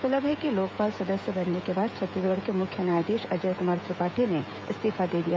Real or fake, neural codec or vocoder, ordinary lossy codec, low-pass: fake; codec, 16 kHz, 16 kbps, FreqCodec, smaller model; none; none